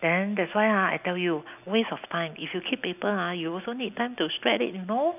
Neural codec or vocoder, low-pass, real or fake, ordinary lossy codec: none; 3.6 kHz; real; none